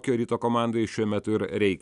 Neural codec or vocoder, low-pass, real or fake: none; 10.8 kHz; real